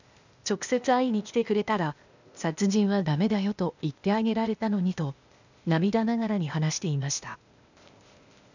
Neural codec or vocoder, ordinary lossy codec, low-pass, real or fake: codec, 16 kHz, 0.8 kbps, ZipCodec; none; 7.2 kHz; fake